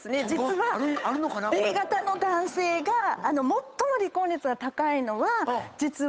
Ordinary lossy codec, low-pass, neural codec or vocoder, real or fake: none; none; codec, 16 kHz, 8 kbps, FunCodec, trained on Chinese and English, 25 frames a second; fake